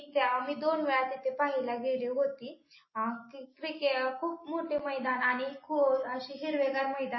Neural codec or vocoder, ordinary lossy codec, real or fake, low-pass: none; MP3, 24 kbps; real; 7.2 kHz